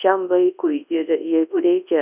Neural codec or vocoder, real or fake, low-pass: codec, 24 kHz, 0.9 kbps, WavTokenizer, large speech release; fake; 3.6 kHz